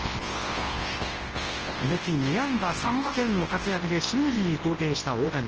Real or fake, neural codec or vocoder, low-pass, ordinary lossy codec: fake; codec, 24 kHz, 0.9 kbps, WavTokenizer, large speech release; 7.2 kHz; Opus, 16 kbps